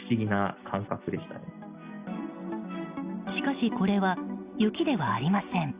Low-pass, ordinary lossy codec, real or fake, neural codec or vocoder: 3.6 kHz; Opus, 64 kbps; real; none